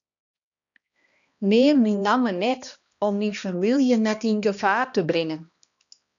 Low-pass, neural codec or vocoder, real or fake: 7.2 kHz; codec, 16 kHz, 1 kbps, X-Codec, HuBERT features, trained on balanced general audio; fake